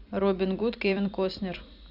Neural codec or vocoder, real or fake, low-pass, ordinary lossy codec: vocoder, 44.1 kHz, 128 mel bands every 256 samples, BigVGAN v2; fake; 5.4 kHz; none